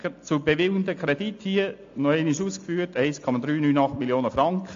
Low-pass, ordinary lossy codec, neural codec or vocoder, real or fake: 7.2 kHz; AAC, 48 kbps; none; real